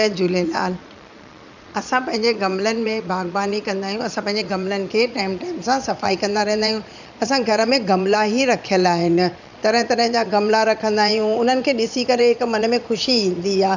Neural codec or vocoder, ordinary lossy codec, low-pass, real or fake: vocoder, 22.05 kHz, 80 mel bands, Vocos; none; 7.2 kHz; fake